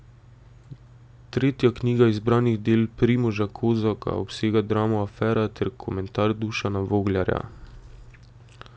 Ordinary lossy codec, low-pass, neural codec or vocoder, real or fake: none; none; none; real